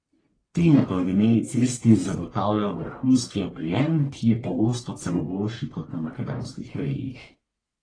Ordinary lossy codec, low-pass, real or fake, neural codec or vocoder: AAC, 32 kbps; 9.9 kHz; fake; codec, 44.1 kHz, 1.7 kbps, Pupu-Codec